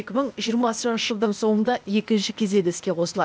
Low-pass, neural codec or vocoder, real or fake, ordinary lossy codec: none; codec, 16 kHz, 0.8 kbps, ZipCodec; fake; none